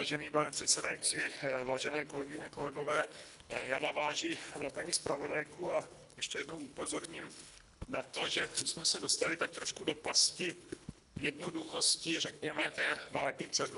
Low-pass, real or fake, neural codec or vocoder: 10.8 kHz; fake; codec, 24 kHz, 1.5 kbps, HILCodec